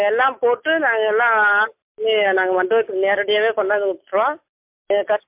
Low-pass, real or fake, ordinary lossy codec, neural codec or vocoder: 3.6 kHz; real; MP3, 32 kbps; none